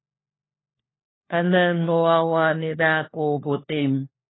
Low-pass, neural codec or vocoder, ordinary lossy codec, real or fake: 7.2 kHz; codec, 16 kHz, 1 kbps, FunCodec, trained on LibriTTS, 50 frames a second; AAC, 16 kbps; fake